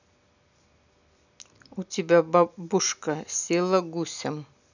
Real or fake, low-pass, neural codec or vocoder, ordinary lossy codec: real; 7.2 kHz; none; none